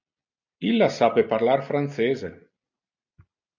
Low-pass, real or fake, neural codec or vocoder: 7.2 kHz; real; none